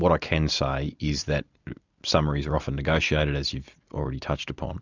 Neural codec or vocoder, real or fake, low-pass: none; real; 7.2 kHz